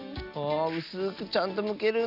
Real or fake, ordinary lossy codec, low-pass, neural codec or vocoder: real; none; 5.4 kHz; none